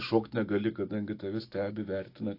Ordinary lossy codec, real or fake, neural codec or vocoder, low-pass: AAC, 32 kbps; fake; autoencoder, 48 kHz, 128 numbers a frame, DAC-VAE, trained on Japanese speech; 5.4 kHz